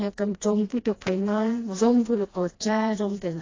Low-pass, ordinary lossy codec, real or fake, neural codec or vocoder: 7.2 kHz; AAC, 32 kbps; fake; codec, 16 kHz, 1 kbps, FreqCodec, smaller model